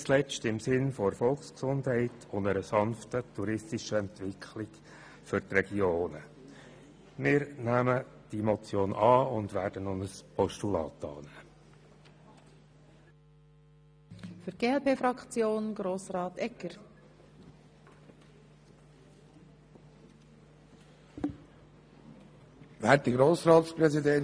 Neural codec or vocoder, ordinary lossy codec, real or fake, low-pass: none; none; real; none